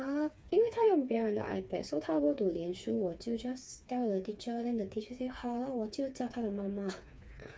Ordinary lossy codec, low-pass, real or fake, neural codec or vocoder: none; none; fake; codec, 16 kHz, 4 kbps, FreqCodec, smaller model